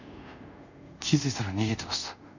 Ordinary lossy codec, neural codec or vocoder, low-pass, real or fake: none; codec, 24 kHz, 0.5 kbps, DualCodec; 7.2 kHz; fake